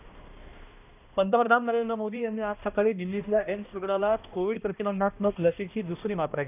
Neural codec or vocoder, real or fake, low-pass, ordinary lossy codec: codec, 16 kHz, 1 kbps, X-Codec, HuBERT features, trained on balanced general audio; fake; 3.6 kHz; none